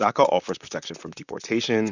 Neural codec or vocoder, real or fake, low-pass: vocoder, 44.1 kHz, 128 mel bands, Pupu-Vocoder; fake; 7.2 kHz